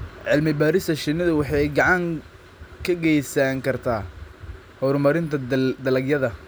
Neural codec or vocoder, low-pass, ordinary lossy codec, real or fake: none; none; none; real